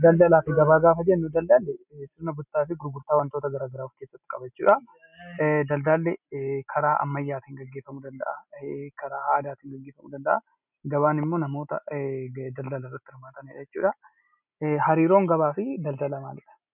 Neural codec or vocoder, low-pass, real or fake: none; 3.6 kHz; real